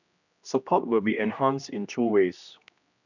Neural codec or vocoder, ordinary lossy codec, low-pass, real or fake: codec, 16 kHz, 1 kbps, X-Codec, HuBERT features, trained on general audio; none; 7.2 kHz; fake